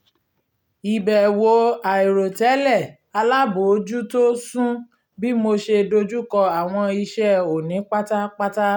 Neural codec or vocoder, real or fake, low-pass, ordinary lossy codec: none; real; 19.8 kHz; none